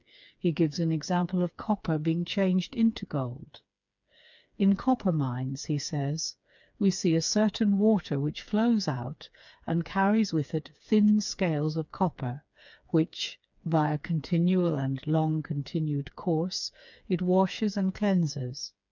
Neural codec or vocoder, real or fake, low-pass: codec, 16 kHz, 4 kbps, FreqCodec, smaller model; fake; 7.2 kHz